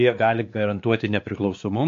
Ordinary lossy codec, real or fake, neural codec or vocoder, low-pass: MP3, 48 kbps; fake; codec, 16 kHz, 2 kbps, X-Codec, WavLM features, trained on Multilingual LibriSpeech; 7.2 kHz